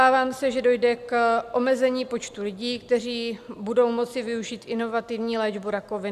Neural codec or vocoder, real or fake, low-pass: none; real; 14.4 kHz